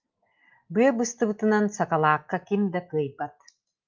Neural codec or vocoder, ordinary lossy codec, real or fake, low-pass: none; Opus, 32 kbps; real; 7.2 kHz